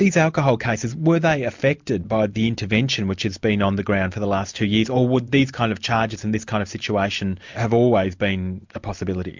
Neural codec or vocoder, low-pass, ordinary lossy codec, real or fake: none; 7.2 kHz; MP3, 64 kbps; real